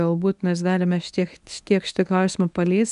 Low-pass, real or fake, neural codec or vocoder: 10.8 kHz; fake; codec, 24 kHz, 0.9 kbps, WavTokenizer, medium speech release version 1